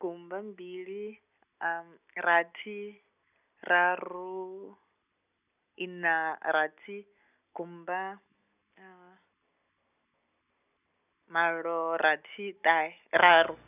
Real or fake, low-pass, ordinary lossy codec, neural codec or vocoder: real; 3.6 kHz; none; none